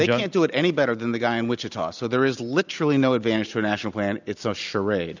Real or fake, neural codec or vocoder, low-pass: real; none; 7.2 kHz